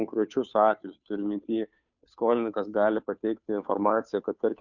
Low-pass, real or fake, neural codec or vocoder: 7.2 kHz; fake; codec, 16 kHz, 2 kbps, FunCodec, trained on Chinese and English, 25 frames a second